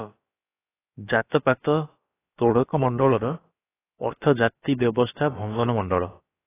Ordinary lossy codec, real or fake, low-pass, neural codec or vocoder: AAC, 16 kbps; fake; 3.6 kHz; codec, 16 kHz, about 1 kbps, DyCAST, with the encoder's durations